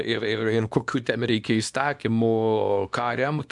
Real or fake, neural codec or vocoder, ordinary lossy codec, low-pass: fake; codec, 24 kHz, 0.9 kbps, WavTokenizer, small release; MP3, 64 kbps; 10.8 kHz